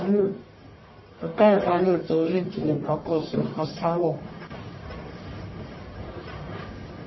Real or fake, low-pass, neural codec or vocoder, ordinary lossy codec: fake; 7.2 kHz; codec, 44.1 kHz, 1.7 kbps, Pupu-Codec; MP3, 24 kbps